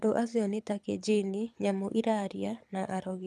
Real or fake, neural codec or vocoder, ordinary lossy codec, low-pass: fake; codec, 24 kHz, 6 kbps, HILCodec; none; none